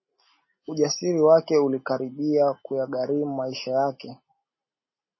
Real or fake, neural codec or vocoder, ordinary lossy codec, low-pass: real; none; MP3, 24 kbps; 7.2 kHz